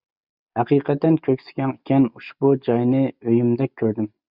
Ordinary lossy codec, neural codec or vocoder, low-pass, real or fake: Opus, 64 kbps; none; 5.4 kHz; real